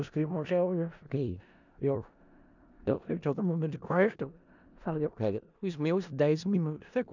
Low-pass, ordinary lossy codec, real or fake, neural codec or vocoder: 7.2 kHz; none; fake; codec, 16 kHz in and 24 kHz out, 0.4 kbps, LongCat-Audio-Codec, four codebook decoder